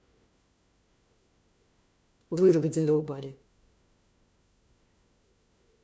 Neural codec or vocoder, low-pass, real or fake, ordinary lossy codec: codec, 16 kHz, 1 kbps, FunCodec, trained on LibriTTS, 50 frames a second; none; fake; none